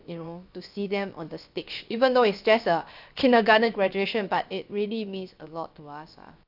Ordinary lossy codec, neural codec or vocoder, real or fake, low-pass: AAC, 48 kbps; codec, 16 kHz, 0.7 kbps, FocalCodec; fake; 5.4 kHz